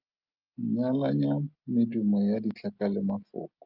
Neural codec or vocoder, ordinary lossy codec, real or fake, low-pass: none; Opus, 24 kbps; real; 5.4 kHz